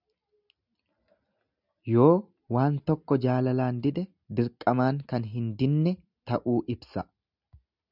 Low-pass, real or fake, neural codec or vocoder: 5.4 kHz; real; none